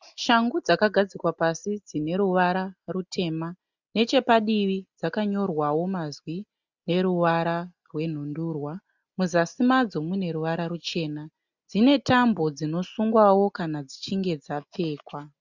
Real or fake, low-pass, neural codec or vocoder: real; 7.2 kHz; none